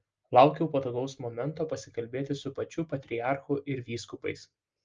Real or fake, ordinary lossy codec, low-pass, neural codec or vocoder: real; Opus, 24 kbps; 7.2 kHz; none